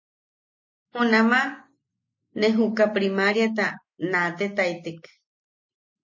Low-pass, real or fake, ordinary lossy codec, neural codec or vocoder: 7.2 kHz; real; MP3, 32 kbps; none